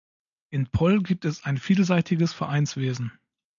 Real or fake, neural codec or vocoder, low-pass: real; none; 7.2 kHz